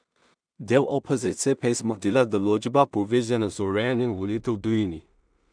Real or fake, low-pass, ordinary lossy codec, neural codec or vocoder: fake; 9.9 kHz; none; codec, 16 kHz in and 24 kHz out, 0.4 kbps, LongCat-Audio-Codec, two codebook decoder